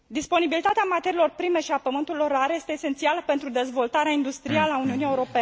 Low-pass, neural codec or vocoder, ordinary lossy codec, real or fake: none; none; none; real